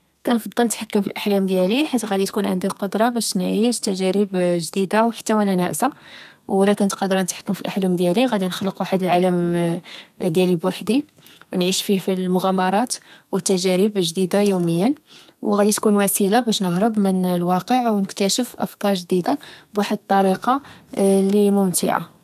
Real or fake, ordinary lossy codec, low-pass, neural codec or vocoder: fake; none; 14.4 kHz; codec, 32 kHz, 1.9 kbps, SNAC